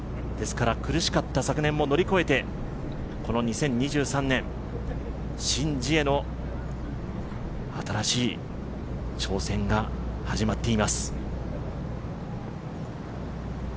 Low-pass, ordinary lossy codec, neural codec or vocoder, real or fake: none; none; none; real